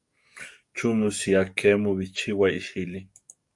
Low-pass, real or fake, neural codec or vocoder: 10.8 kHz; fake; codec, 44.1 kHz, 7.8 kbps, DAC